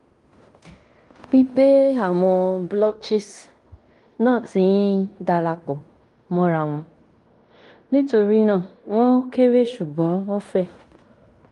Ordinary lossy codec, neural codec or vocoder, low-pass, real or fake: Opus, 32 kbps; codec, 16 kHz in and 24 kHz out, 0.9 kbps, LongCat-Audio-Codec, fine tuned four codebook decoder; 10.8 kHz; fake